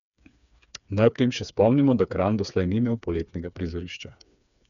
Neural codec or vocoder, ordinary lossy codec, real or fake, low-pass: codec, 16 kHz, 4 kbps, FreqCodec, smaller model; none; fake; 7.2 kHz